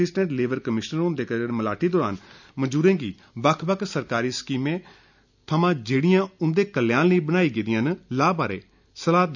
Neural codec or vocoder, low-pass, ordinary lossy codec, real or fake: none; 7.2 kHz; none; real